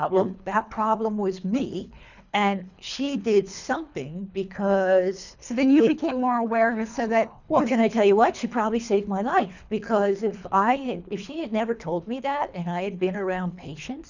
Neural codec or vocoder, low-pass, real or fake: codec, 24 kHz, 3 kbps, HILCodec; 7.2 kHz; fake